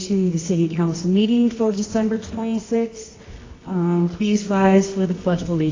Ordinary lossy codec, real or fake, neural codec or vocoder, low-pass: AAC, 32 kbps; fake; codec, 24 kHz, 0.9 kbps, WavTokenizer, medium music audio release; 7.2 kHz